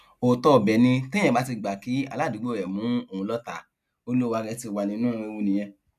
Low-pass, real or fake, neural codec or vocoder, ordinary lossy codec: 14.4 kHz; real; none; none